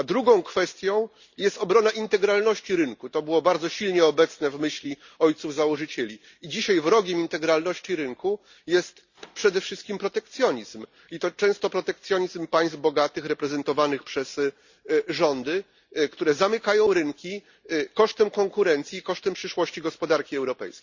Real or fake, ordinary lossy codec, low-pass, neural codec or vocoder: real; Opus, 64 kbps; 7.2 kHz; none